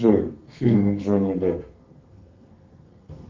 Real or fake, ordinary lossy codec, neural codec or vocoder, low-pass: fake; Opus, 24 kbps; codec, 32 kHz, 1.9 kbps, SNAC; 7.2 kHz